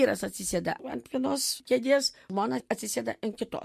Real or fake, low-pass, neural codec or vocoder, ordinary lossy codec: real; 14.4 kHz; none; MP3, 64 kbps